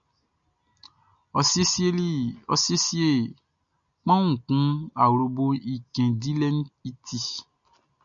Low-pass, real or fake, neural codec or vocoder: 7.2 kHz; real; none